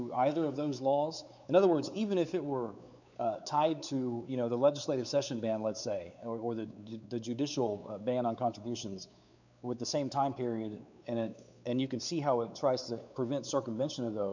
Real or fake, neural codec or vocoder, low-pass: fake; codec, 16 kHz, 4 kbps, X-Codec, WavLM features, trained on Multilingual LibriSpeech; 7.2 kHz